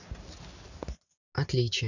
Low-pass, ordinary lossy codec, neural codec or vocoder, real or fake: 7.2 kHz; none; none; real